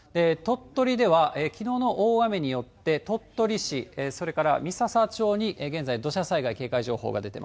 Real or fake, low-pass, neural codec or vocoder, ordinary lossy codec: real; none; none; none